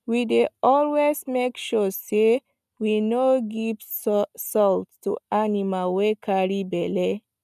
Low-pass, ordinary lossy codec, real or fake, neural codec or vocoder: 14.4 kHz; none; real; none